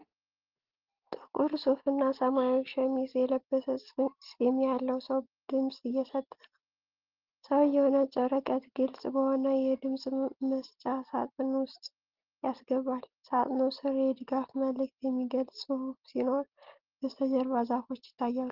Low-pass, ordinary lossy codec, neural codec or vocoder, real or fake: 5.4 kHz; Opus, 16 kbps; none; real